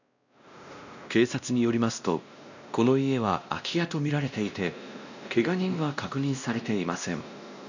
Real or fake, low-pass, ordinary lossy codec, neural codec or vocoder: fake; 7.2 kHz; none; codec, 16 kHz, 1 kbps, X-Codec, WavLM features, trained on Multilingual LibriSpeech